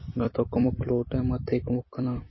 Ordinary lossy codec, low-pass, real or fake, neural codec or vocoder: MP3, 24 kbps; 7.2 kHz; fake; vocoder, 44.1 kHz, 128 mel bands every 256 samples, BigVGAN v2